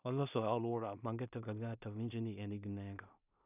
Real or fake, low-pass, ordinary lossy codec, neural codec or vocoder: fake; 3.6 kHz; none; codec, 16 kHz in and 24 kHz out, 0.4 kbps, LongCat-Audio-Codec, two codebook decoder